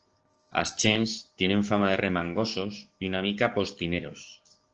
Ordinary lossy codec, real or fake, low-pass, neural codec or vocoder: Opus, 16 kbps; fake; 7.2 kHz; codec, 16 kHz, 6 kbps, DAC